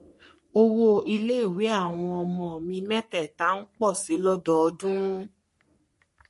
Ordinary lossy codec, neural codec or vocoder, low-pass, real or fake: MP3, 48 kbps; codec, 44.1 kHz, 3.4 kbps, Pupu-Codec; 14.4 kHz; fake